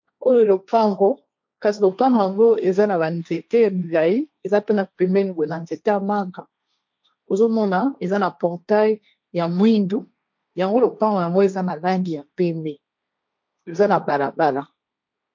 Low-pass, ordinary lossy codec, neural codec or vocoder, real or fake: 7.2 kHz; MP3, 48 kbps; codec, 16 kHz, 1.1 kbps, Voila-Tokenizer; fake